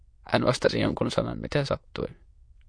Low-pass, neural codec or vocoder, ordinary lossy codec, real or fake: 9.9 kHz; autoencoder, 22.05 kHz, a latent of 192 numbers a frame, VITS, trained on many speakers; MP3, 48 kbps; fake